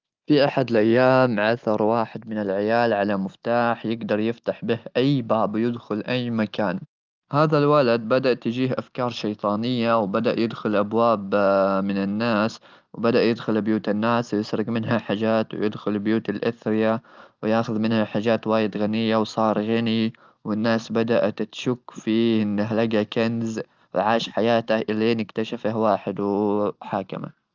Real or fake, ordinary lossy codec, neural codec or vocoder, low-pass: real; Opus, 32 kbps; none; 7.2 kHz